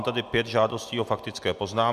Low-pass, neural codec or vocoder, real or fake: 14.4 kHz; none; real